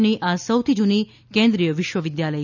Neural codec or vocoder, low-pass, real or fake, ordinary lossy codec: none; 7.2 kHz; real; none